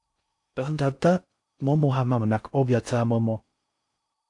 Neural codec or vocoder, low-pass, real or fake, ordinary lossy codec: codec, 16 kHz in and 24 kHz out, 0.6 kbps, FocalCodec, streaming, 4096 codes; 10.8 kHz; fake; AAC, 64 kbps